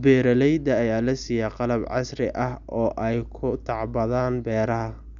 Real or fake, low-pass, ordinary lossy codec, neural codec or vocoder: real; 7.2 kHz; none; none